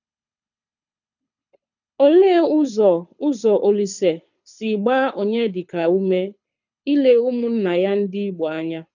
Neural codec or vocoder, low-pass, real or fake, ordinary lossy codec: codec, 24 kHz, 6 kbps, HILCodec; 7.2 kHz; fake; none